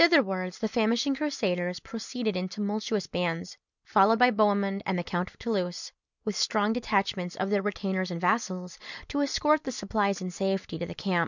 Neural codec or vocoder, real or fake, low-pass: none; real; 7.2 kHz